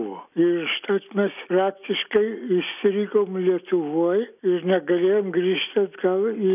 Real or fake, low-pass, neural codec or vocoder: real; 3.6 kHz; none